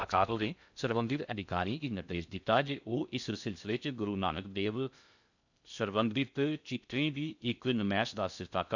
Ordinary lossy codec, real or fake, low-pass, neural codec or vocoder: none; fake; 7.2 kHz; codec, 16 kHz in and 24 kHz out, 0.6 kbps, FocalCodec, streaming, 2048 codes